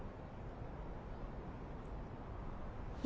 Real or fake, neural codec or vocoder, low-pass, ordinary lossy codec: real; none; none; none